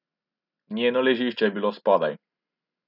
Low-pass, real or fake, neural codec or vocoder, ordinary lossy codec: 5.4 kHz; real; none; none